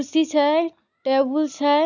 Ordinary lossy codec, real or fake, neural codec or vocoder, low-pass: none; real; none; 7.2 kHz